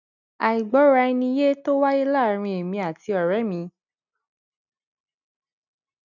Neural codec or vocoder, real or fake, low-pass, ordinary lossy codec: none; real; 7.2 kHz; none